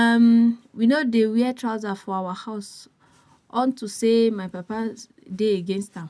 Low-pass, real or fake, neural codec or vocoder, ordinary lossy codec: none; real; none; none